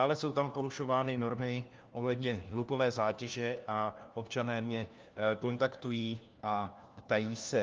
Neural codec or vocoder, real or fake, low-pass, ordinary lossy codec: codec, 16 kHz, 1 kbps, FunCodec, trained on LibriTTS, 50 frames a second; fake; 7.2 kHz; Opus, 24 kbps